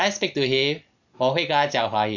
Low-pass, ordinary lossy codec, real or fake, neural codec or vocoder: 7.2 kHz; none; real; none